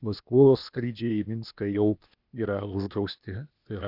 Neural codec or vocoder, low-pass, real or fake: codec, 16 kHz, 0.8 kbps, ZipCodec; 5.4 kHz; fake